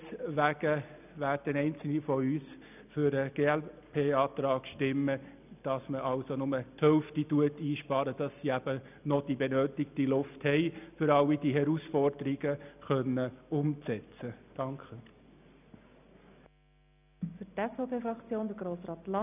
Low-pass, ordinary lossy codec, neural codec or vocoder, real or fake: 3.6 kHz; none; none; real